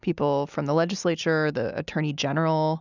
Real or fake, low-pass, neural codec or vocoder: real; 7.2 kHz; none